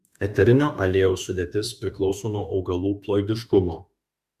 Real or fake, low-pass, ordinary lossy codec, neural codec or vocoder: fake; 14.4 kHz; Opus, 64 kbps; autoencoder, 48 kHz, 32 numbers a frame, DAC-VAE, trained on Japanese speech